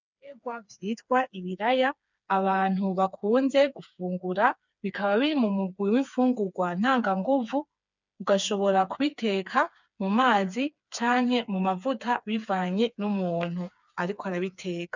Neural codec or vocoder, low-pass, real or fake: codec, 16 kHz, 4 kbps, FreqCodec, smaller model; 7.2 kHz; fake